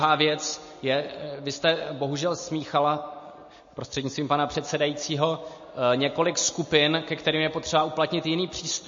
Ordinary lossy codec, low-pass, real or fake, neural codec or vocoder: MP3, 32 kbps; 7.2 kHz; real; none